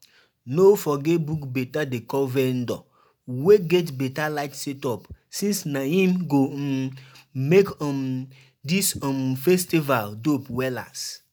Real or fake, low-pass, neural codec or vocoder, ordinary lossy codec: real; none; none; none